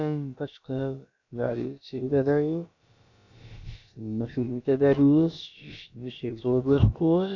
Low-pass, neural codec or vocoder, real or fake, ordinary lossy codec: 7.2 kHz; codec, 16 kHz, about 1 kbps, DyCAST, with the encoder's durations; fake; none